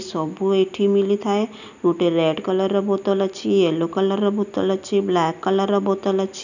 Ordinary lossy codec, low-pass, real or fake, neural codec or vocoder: none; 7.2 kHz; real; none